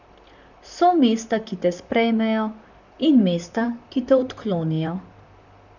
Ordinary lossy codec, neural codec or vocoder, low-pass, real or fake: none; vocoder, 44.1 kHz, 128 mel bands, Pupu-Vocoder; 7.2 kHz; fake